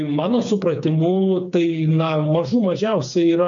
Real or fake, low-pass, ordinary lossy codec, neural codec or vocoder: fake; 7.2 kHz; MP3, 96 kbps; codec, 16 kHz, 4 kbps, FreqCodec, smaller model